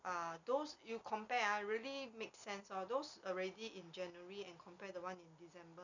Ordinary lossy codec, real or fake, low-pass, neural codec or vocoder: none; real; 7.2 kHz; none